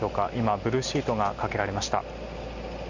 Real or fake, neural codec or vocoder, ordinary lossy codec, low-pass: real; none; Opus, 64 kbps; 7.2 kHz